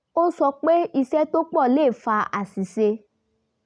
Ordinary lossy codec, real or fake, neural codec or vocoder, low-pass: none; real; none; 9.9 kHz